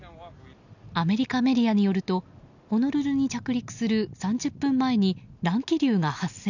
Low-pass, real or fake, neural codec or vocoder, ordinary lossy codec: 7.2 kHz; real; none; none